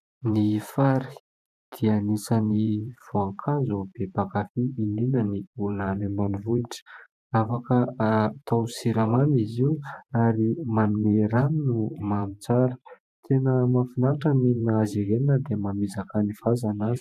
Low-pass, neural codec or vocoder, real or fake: 14.4 kHz; vocoder, 44.1 kHz, 128 mel bands every 512 samples, BigVGAN v2; fake